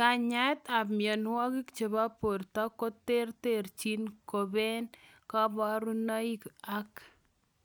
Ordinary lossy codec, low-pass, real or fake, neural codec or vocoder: none; none; real; none